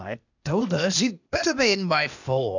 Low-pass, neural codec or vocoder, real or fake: 7.2 kHz; codec, 16 kHz, 0.8 kbps, ZipCodec; fake